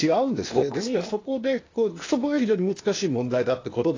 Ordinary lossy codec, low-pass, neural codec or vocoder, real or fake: AAC, 32 kbps; 7.2 kHz; codec, 16 kHz, 0.8 kbps, ZipCodec; fake